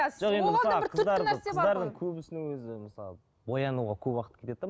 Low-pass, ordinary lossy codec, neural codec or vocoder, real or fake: none; none; none; real